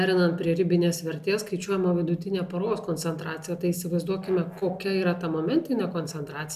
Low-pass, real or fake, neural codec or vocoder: 14.4 kHz; real; none